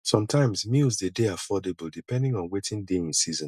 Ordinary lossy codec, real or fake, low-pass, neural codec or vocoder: none; real; 14.4 kHz; none